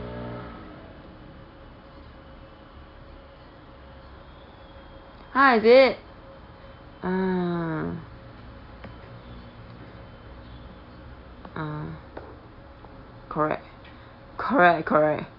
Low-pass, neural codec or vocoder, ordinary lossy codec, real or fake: 5.4 kHz; none; none; real